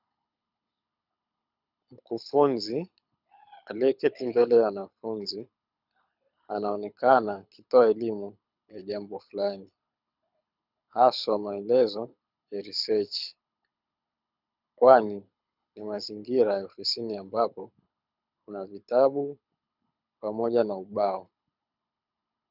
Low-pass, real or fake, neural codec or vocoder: 5.4 kHz; fake; codec, 24 kHz, 6 kbps, HILCodec